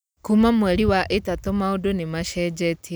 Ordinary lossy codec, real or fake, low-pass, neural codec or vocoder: none; real; none; none